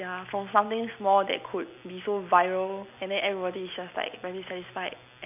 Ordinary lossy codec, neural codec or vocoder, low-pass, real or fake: none; none; 3.6 kHz; real